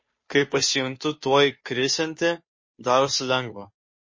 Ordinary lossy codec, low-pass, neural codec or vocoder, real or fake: MP3, 32 kbps; 7.2 kHz; codec, 16 kHz, 2 kbps, FunCodec, trained on Chinese and English, 25 frames a second; fake